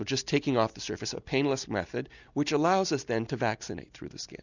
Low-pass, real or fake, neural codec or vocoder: 7.2 kHz; real; none